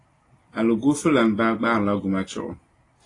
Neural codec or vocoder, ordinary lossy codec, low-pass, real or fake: none; AAC, 32 kbps; 10.8 kHz; real